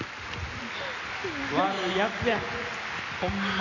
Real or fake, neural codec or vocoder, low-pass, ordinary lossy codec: real; none; 7.2 kHz; none